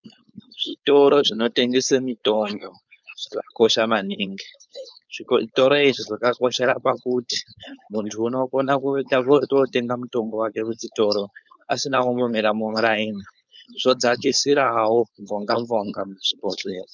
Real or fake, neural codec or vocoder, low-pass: fake; codec, 16 kHz, 4.8 kbps, FACodec; 7.2 kHz